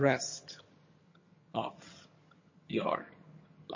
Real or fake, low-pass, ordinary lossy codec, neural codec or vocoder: fake; 7.2 kHz; MP3, 32 kbps; vocoder, 22.05 kHz, 80 mel bands, HiFi-GAN